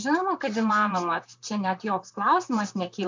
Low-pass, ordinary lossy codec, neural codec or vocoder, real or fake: 7.2 kHz; MP3, 64 kbps; vocoder, 44.1 kHz, 128 mel bands, Pupu-Vocoder; fake